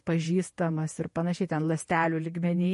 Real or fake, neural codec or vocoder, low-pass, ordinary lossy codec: fake; vocoder, 48 kHz, 128 mel bands, Vocos; 14.4 kHz; MP3, 48 kbps